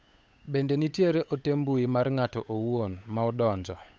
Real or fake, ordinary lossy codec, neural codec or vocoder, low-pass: fake; none; codec, 16 kHz, 8 kbps, FunCodec, trained on Chinese and English, 25 frames a second; none